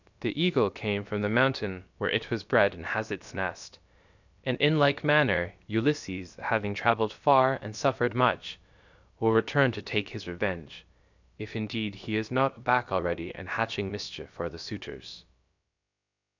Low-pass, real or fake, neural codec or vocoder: 7.2 kHz; fake; codec, 16 kHz, about 1 kbps, DyCAST, with the encoder's durations